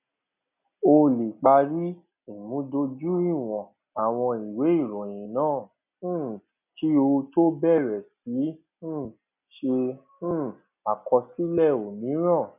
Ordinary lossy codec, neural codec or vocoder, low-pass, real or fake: none; none; 3.6 kHz; real